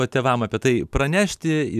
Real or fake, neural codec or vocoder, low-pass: real; none; 14.4 kHz